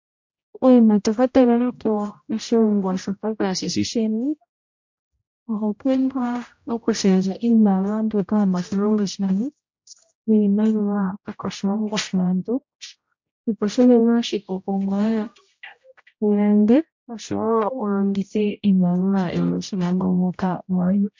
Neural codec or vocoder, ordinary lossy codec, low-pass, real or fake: codec, 16 kHz, 0.5 kbps, X-Codec, HuBERT features, trained on general audio; MP3, 48 kbps; 7.2 kHz; fake